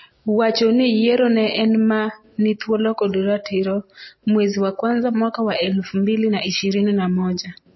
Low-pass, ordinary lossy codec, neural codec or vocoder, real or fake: 7.2 kHz; MP3, 24 kbps; none; real